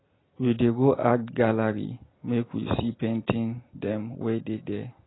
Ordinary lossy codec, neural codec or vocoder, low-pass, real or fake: AAC, 16 kbps; none; 7.2 kHz; real